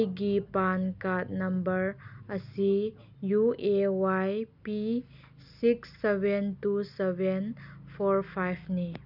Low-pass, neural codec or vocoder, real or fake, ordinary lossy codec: 5.4 kHz; none; real; none